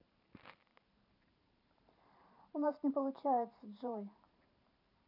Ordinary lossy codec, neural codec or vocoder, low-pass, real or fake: none; vocoder, 44.1 kHz, 128 mel bands every 512 samples, BigVGAN v2; 5.4 kHz; fake